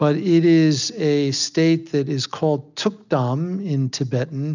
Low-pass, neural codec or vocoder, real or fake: 7.2 kHz; none; real